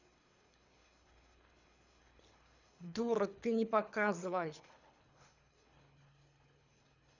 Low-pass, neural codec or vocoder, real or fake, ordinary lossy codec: 7.2 kHz; codec, 24 kHz, 3 kbps, HILCodec; fake; none